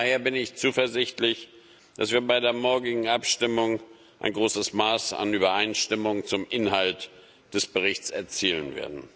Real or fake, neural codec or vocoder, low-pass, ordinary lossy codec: real; none; none; none